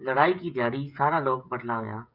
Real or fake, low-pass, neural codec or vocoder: fake; 5.4 kHz; codec, 16 kHz, 16 kbps, FreqCodec, smaller model